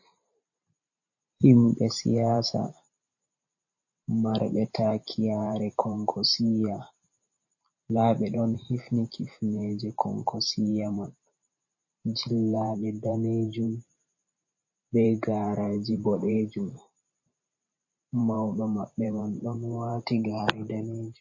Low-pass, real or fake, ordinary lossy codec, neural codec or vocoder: 7.2 kHz; fake; MP3, 32 kbps; vocoder, 44.1 kHz, 128 mel bands every 512 samples, BigVGAN v2